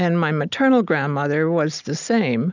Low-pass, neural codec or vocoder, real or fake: 7.2 kHz; none; real